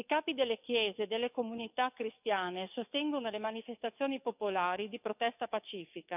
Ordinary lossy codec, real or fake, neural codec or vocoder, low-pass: none; fake; codec, 44.1 kHz, 7.8 kbps, DAC; 3.6 kHz